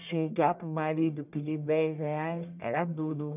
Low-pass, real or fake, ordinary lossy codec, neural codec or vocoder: 3.6 kHz; fake; none; codec, 44.1 kHz, 1.7 kbps, Pupu-Codec